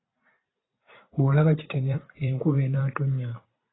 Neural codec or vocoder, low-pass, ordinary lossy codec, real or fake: vocoder, 44.1 kHz, 128 mel bands every 512 samples, BigVGAN v2; 7.2 kHz; AAC, 16 kbps; fake